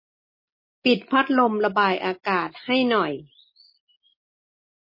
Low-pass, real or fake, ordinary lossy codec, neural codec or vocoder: 5.4 kHz; real; MP3, 24 kbps; none